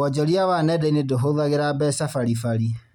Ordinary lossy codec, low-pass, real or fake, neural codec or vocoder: none; 19.8 kHz; real; none